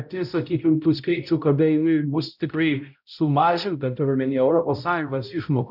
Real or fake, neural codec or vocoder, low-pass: fake; codec, 16 kHz, 0.5 kbps, X-Codec, HuBERT features, trained on balanced general audio; 5.4 kHz